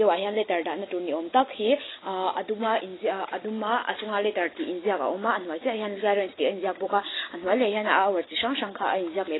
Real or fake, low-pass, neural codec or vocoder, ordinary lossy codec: real; 7.2 kHz; none; AAC, 16 kbps